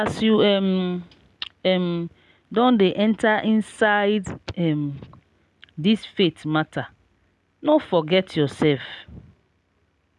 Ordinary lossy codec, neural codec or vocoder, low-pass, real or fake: none; none; none; real